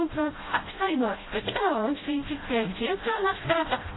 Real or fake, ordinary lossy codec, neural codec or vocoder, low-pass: fake; AAC, 16 kbps; codec, 16 kHz, 0.5 kbps, FreqCodec, smaller model; 7.2 kHz